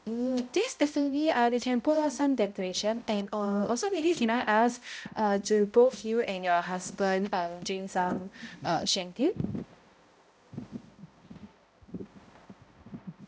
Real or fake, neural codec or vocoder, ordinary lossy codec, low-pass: fake; codec, 16 kHz, 0.5 kbps, X-Codec, HuBERT features, trained on balanced general audio; none; none